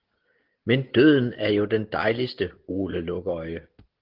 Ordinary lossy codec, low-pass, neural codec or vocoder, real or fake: Opus, 16 kbps; 5.4 kHz; none; real